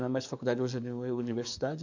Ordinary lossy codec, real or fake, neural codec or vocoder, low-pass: none; fake; codec, 44.1 kHz, 7.8 kbps, DAC; 7.2 kHz